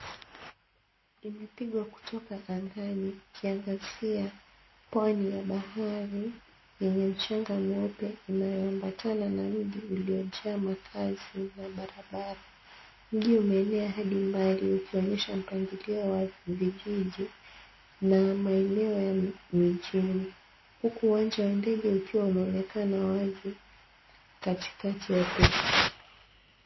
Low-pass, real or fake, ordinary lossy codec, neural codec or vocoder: 7.2 kHz; real; MP3, 24 kbps; none